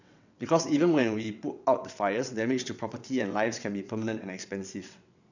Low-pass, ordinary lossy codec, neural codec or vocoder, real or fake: 7.2 kHz; none; vocoder, 22.05 kHz, 80 mel bands, WaveNeXt; fake